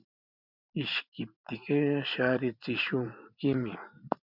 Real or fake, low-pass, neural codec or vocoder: fake; 5.4 kHz; vocoder, 44.1 kHz, 128 mel bands every 512 samples, BigVGAN v2